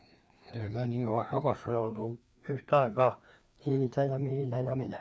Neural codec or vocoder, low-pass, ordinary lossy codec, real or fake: codec, 16 kHz, 2 kbps, FreqCodec, larger model; none; none; fake